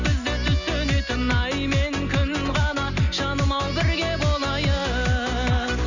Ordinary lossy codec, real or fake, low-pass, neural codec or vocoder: none; real; 7.2 kHz; none